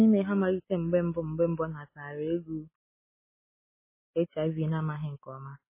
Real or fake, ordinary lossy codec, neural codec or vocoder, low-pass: real; MP3, 16 kbps; none; 3.6 kHz